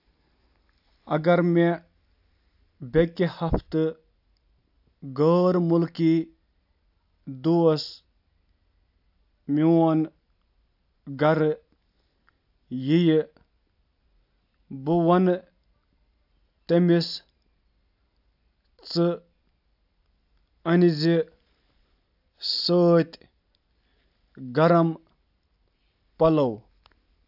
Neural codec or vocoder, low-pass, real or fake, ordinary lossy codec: none; 5.4 kHz; real; AAC, 48 kbps